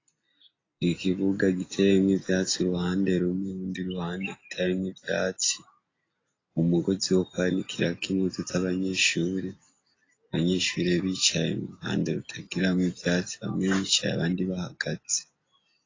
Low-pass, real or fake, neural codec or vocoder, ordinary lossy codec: 7.2 kHz; real; none; AAC, 32 kbps